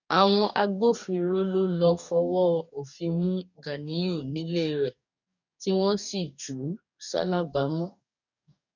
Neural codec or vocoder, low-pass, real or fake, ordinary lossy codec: codec, 44.1 kHz, 2.6 kbps, DAC; 7.2 kHz; fake; none